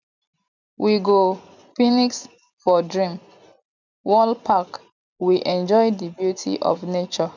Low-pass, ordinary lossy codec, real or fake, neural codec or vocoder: 7.2 kHz; none; real; none